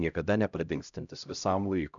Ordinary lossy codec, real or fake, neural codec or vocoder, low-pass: MP3, 96 kbps; fake; codec, 16 kHz, 0.5 kbps, X-Codec, HuBERT features, trained on LibriSpeech; 7.2 kHz